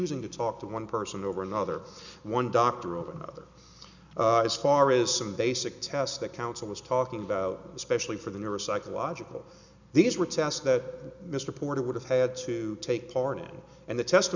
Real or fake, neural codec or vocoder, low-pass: real; none; 7.2 kHz